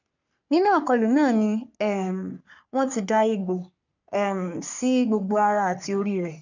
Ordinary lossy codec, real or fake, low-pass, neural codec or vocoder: none; fake; 7.2 kHz; codec, 44.1 kHz, 3.4 kbps, Pupu-Codec